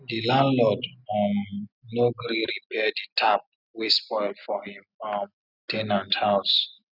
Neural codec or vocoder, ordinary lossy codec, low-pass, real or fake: none; none; 5.4 kHz; real